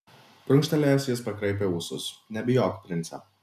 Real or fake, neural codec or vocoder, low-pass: real; none; 14.4 kHz